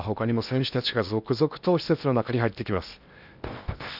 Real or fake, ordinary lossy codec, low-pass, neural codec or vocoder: fake; none; 5.4 kHz; codec, 16 kHz in and 24 kHz out, 0.8 kbps, FocalCodec, streaming, 65536 codes